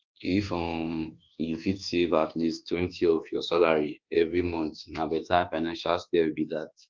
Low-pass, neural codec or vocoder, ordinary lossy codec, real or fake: 7.2 kHz; codec, 24 kHz, 1.2 kbps, DualCodec; Opus, 32 kbps; fake